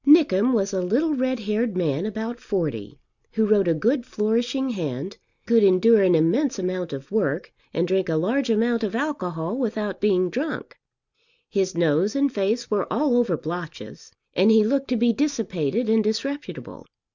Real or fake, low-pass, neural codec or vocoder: real; 7.2 kHz; none